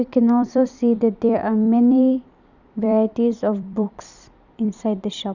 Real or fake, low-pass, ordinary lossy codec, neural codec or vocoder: fake; 7.2 kHz; none; vocoder, 44.1 kHz, 128 mel bands every 256 samples, BigVGAN v2